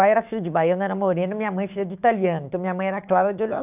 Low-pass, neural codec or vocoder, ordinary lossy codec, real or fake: 3.6 kHz; codec, 16 kHz, 6 kbps, DAC; none; fake